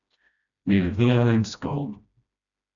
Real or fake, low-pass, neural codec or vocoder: fake; 7.2 kHz; codec, 16 kHz, 1 kbps, FreqCodec, smaller model